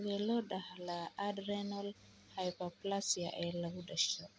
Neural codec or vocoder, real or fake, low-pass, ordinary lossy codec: none; real; none; none